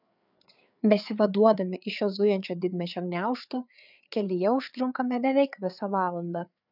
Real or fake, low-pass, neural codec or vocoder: fake; 5.4 kHz; codec, 16 kHz, 4 kbps, FreqCodec, larger model